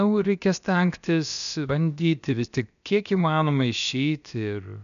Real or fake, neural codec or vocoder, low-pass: fake; codec, 16 kHz, about 1 kbps, DyCAST, with the encoder's durations; 7.2 kHz